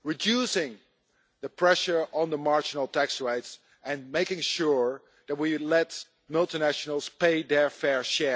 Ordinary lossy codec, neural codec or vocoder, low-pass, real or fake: none; none; none; real